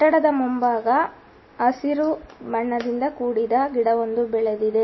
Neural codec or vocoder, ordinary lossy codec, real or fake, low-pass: none; MP3, 24 kbps; real; 7.2 kHz